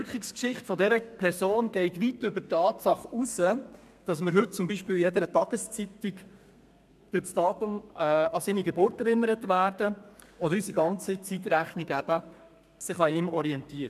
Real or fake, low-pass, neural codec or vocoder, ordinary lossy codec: fake; 14.4 kHz; codec, 32 kHz, 1.9 kbps, SNAC; MP3, 96 kbps